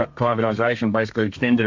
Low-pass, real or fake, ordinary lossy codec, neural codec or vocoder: 7.2 kHz; fake; MP3, 48 kbps; codec, 32 kHz, 1.9 kbps, SNAC